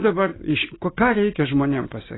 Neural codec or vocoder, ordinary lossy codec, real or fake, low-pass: none; AAC, 16 kbps; real; 7.2 kHz